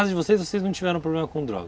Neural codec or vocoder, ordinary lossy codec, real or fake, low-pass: none; none; real; none